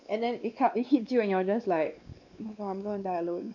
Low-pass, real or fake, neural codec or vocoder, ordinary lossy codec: 7.2 kHz; fake; codec, 16 kHz, 2 kbps, X-Codec, WavLM features, trained on Multilingual LibriSpeech; none